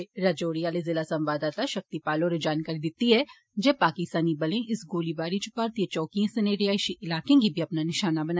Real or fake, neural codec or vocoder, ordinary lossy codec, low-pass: real; none; none; none